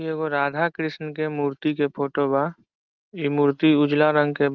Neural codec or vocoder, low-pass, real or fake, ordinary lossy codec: none; 7.2 kHz; real; Opus, 32 kbps